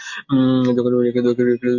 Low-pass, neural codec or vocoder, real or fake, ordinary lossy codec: 7.2 kHz; none; real; AAC, 48 kbps